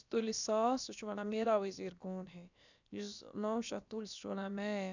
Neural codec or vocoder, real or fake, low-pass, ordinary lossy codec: codec, 16 kHz, about 1 kbps, DyCAST, with the encoder's durations; fake; 7.2 kHz; none